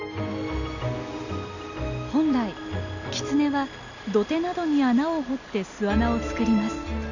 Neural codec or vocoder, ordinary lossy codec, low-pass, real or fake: none; none; 7.2 kHz; real